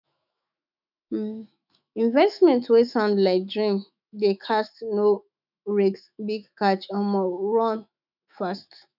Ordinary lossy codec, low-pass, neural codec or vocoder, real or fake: none; 5.4 kHz; autoencoder, 48 kHz, 128 numbers a frame, DAC-VAE, trained on Japanese speech; fake